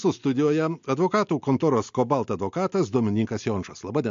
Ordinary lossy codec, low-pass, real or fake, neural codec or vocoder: MP3, 48 kbps; 7.2 kHz; real; none